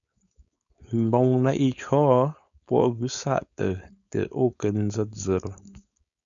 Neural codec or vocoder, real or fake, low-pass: codec, 16 kHz, 4.8 kbps, FACodec; fake; 7.2 kHz